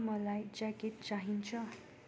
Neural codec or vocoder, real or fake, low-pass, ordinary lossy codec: none; real; none; none